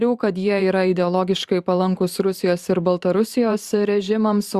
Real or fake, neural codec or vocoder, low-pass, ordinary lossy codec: fake; vocoder, 44.1 kHz, 128 mel bands every 512 samples, BigVGAN v2; 14.4 kHz; Opus, 64 kbps